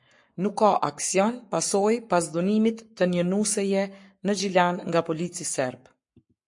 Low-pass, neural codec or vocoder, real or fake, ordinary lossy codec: 10.8 kHz; codec, 44.1 kHz, 7.8 kbps, Pupu-Codec; fake; MP3, 48 kbps